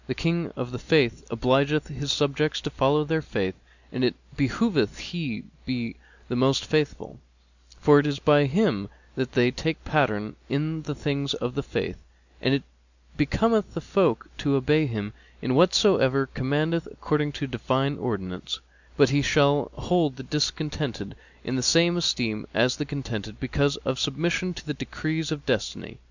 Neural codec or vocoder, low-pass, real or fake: none; 7.2 kHz; real